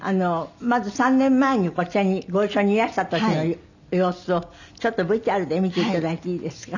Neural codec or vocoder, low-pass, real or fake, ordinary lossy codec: none; 7.2 kHz; real; none